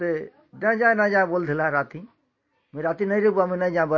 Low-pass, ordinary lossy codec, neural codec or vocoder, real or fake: 7.2 kHz; MP3, 32 kbps; none; real